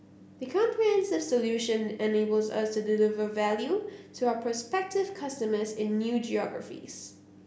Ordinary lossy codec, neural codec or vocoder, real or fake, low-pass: none; none; real; none